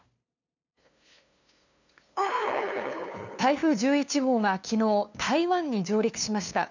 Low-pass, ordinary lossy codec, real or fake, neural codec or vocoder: 7.2 kHz; none; fake; codec, 16 kHz, 2 kbps, FunCodec, trained on LibriTTS, 25 frames a second